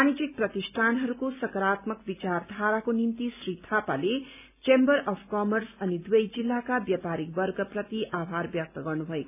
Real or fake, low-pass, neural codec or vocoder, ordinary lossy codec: real; 3.6 kHz; none; none